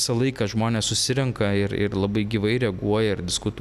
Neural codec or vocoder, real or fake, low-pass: none; real; 14.4 kHz